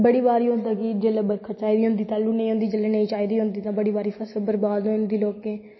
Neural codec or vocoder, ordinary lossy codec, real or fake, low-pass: none; MP3, 24 kbps; real; 7.2 kHz